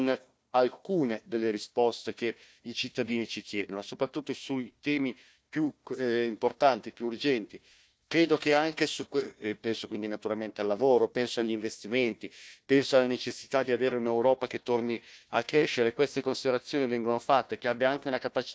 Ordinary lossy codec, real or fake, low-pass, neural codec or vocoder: none; fake; none; codec, 16 kHz, 1 kbps, FunCodec, trained on Chinese and English, 50 frames a second